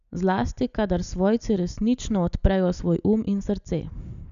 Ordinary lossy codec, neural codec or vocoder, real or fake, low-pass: none; none; real; 7.2 kHz